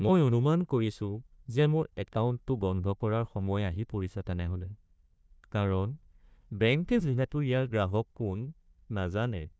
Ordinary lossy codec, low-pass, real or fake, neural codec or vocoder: none; none; fake; codec, 16 kHz, 1 kbps, FunCodec, trained on Chinese and English, 50 frames a second